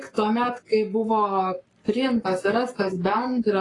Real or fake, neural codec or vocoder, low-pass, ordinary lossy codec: fake; codec, 44.1 kHz, 7.8 kbps, Pupu-Codec; 10.8 kHz; AAC, 32 kbps